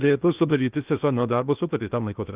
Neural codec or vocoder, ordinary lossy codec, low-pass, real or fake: codec, 16 kHz in and 24 kHz out, 0.8 kbps, FocalCodec, streaming, 65536 codes; Opus, 24 kbps; 3.6 kHz; fake